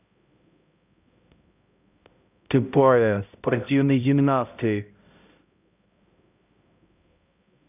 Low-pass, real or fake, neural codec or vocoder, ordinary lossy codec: 3.6 kHz; fake; codec, 16 kHz, 0.5 kbps, X-Codec, HuBERT features, trained on balanced general audio; none